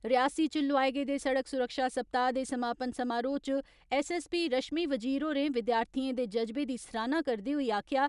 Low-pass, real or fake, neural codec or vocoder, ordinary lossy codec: 10.8 kHz; real; none; none